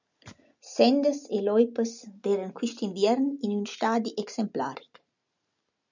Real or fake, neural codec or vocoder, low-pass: real; none; 7.2 kHz